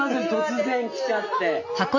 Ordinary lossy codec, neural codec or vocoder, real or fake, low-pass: none; none; real; 7.2 kHz